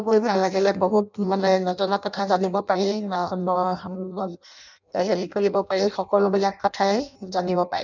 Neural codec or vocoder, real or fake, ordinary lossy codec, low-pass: codec, 16 kHz in and 24 kHz out, 0.6 kbps, FireRedTTS-2 codec; fake; none; 7.2 kHz